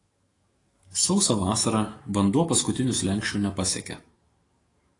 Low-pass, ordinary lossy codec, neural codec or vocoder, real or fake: 10.8 kHz; AAC, 32 kbps; autoencoder, 48 kHz, 128 numbers a frame, DAC-VAE, trained on Japanese speech; fake